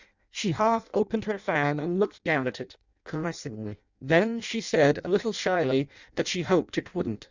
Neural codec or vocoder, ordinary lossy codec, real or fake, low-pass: codec, 16 kHz in and 24 kHz out, 0.6 kbps, FireRedTTS-2 codec; Opus, 64 kbps; fake; 7.2 kHz